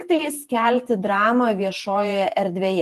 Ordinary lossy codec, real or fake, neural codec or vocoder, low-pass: Opus, 24 kbps; fake; vocoder, 48 kHz, 128 mel bands, Vocos; 14.4 kHz